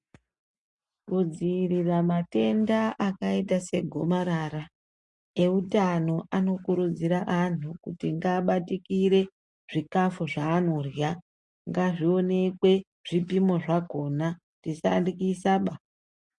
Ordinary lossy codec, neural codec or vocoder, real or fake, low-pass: MP3, 48 kbps; none; real; 10.8 kHz